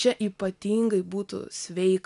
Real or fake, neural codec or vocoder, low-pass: real; none; 10.8 kHz